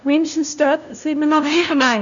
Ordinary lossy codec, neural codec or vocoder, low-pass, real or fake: none; codec, 16 kHz, 0.5 kbps, FunCodec, trained on LibriTTS, 25 frames a second; 7.2 kHz; fake